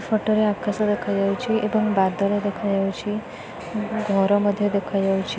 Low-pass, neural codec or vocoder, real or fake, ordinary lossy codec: none; none; real; none